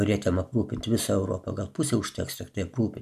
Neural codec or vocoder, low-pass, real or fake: none; 14.4 kHz; real